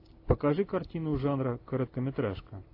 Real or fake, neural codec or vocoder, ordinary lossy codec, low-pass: real; none; AAC, 32 kbps; 5.4 kHz